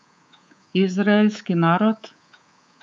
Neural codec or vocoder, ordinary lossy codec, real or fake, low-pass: codec, 24 kHz, 3.1 kbps, DualCodec; none; fake; 9.9 kHz